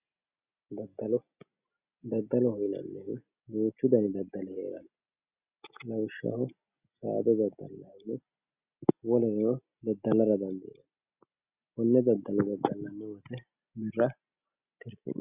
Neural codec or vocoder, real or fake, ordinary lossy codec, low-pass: none; real; Opus, 64 kbps; 3.6 kHz